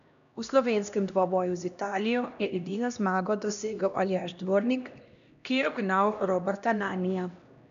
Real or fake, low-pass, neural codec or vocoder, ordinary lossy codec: fake; 7.2 kHz; codec, 16 kHz, 1 kbps, X-Codec, HuBERT features, trained on LibriSpeech; none